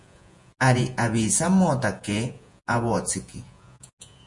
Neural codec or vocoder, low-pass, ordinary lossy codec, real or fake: vocoder, 48 kHz, 128 mel bands, Vocos; 10.8 kHz; MP3, 48 kbps; fake